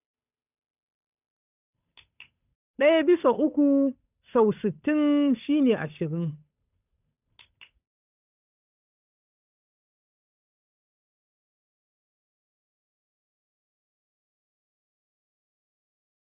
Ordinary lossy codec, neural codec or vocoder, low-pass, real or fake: none; codec, 16 kHz, 8 kbps, FunCodec, trained on Chinese and English, 25 frames a second; 3.6 kHz; fake